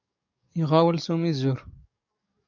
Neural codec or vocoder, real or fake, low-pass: codec, 44.1 kHz, 7.8 kbps, DAC; fake; 7.2 kHz